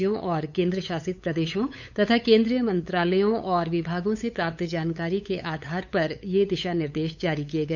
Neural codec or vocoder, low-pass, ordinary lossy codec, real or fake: codec, 16 kHz, 8 kbps, FunCodec, trained on LibriTTS, 25 frames a second; 7.2 kHz; none; fake